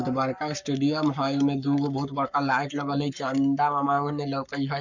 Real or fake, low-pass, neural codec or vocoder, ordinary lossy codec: fake; 7.2 kHz; codec, 44.1 kHz, 7.8 kbps, Pupu-Codec; none